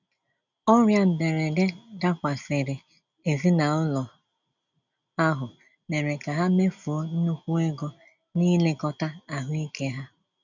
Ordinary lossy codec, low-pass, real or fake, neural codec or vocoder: none; 7.2 kHz; real; none